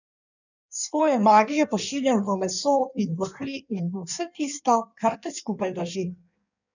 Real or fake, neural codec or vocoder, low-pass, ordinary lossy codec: fake; codec, 16 kHz in and 24 kHz out, 1.1 kbps, FireRedTTS-2 codec; 7.2 kHz; none